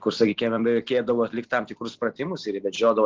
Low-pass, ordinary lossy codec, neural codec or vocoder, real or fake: 7.2 kHz; Opus, 16 kbps; none; real